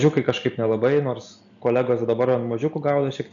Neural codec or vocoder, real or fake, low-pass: none; real; 7.2 kHz